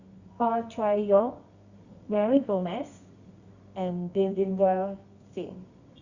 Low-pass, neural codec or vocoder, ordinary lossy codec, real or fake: 7.2 kHz; codec, 24 kHz, 0.9 kbps, WavTokenizer, medium music audio release; Opus, 64 kbps; fake